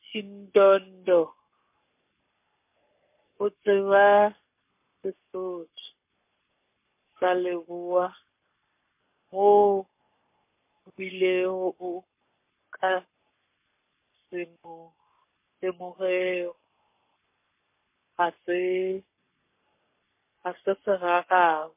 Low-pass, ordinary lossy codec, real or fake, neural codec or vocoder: 3.6 kHz; MP3, 24 kbps; fake; codec, 44.1 kHz, 7.8 kbps, Pupu-Codec